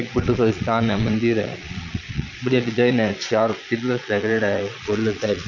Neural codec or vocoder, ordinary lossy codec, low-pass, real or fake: vocoder, 22.05 kHz, 80 mel bands, Vocos; none; 7.2 kHz; fake